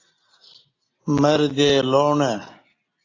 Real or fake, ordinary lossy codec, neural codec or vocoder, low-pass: real; AAC, 32 kbps; none; 7.2 kHz